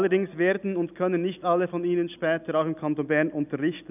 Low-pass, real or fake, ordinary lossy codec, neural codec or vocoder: 3.6 kHz; real; none; none